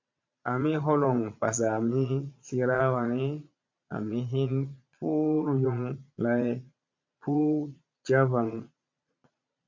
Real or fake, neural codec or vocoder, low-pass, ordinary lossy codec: fake; vocoder, 22.05 kHz, 80 mel bands, Vocos; 7.2 kHz; MP3, 64 kbps